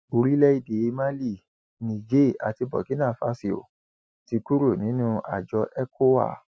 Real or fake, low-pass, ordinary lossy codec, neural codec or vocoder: real; none; none; none